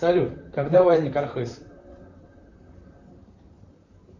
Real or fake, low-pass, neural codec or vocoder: fake; 7.2 kHz; vocoder, 44.1 kHz, 128 mel bands, Pupu-Vocoder